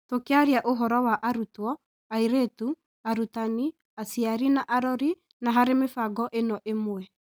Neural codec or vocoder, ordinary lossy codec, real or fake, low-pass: none; none; real; none